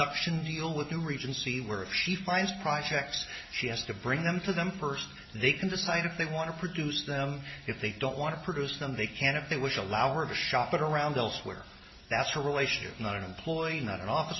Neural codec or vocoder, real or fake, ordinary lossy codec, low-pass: none; real; MP3, 24 kbps; 7.2 kHz